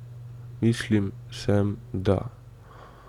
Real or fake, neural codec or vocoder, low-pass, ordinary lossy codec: real; none; 19.8 kHz; none